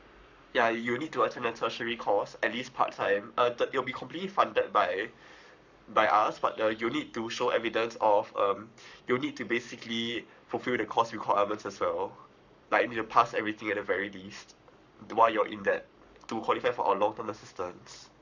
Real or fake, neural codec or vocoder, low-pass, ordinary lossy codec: fake; codec, 44.1 kHz, 7.8 kbps, Pupu-Codec; 7.2 kHz; none